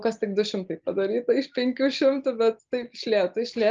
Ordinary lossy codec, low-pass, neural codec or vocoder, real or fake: Opus, 24 kbps; 7.2 kHz; none; real